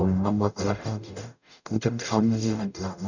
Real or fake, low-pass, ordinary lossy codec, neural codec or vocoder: fake; 7.2 kHz; none; codec, 44.1 kHz, 0.9 kbps, DAC